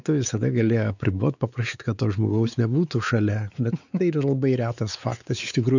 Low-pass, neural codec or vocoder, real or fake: 7.2 kHz; codec, 16 kHz, 4 kbps, X-Codec, WavLM features, trained on Multilingual LibriSpeech; fake